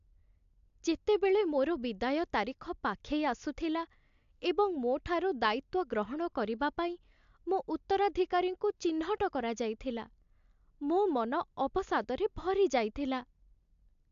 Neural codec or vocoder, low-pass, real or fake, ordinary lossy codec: none; 7.2 kHz; real; AAC, 64 kbps